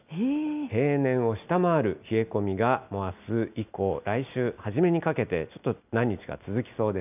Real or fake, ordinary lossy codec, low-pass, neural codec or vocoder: real; none; 3.6 kHz; none